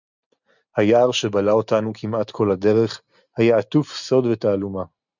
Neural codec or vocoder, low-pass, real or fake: none; 7.2 kHz; real